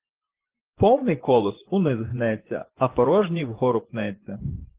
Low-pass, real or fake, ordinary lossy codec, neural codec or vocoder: 3.6 kHz; fake; Opus, 16 kbps; vocoder, 24 kHz, 100 mel bands, Vocos